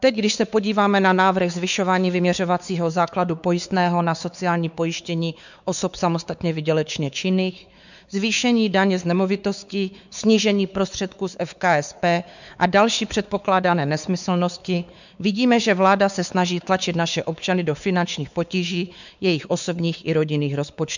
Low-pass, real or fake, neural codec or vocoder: 7.2 kHz; fake; codec, 16 kHz, 4 kbps, X-Codec, WavLM features, trained on Multilingual LibriSpeech